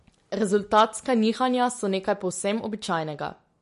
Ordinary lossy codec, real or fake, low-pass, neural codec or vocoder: MP3, 48 kbps; real; 14.4 kHz; none